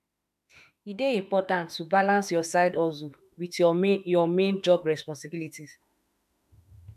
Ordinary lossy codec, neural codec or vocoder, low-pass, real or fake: none; autoencoder, 48 kHz, 32 numbers a frame, DAC-VAE, trained on Japanese speech; 14.4 kHz; fake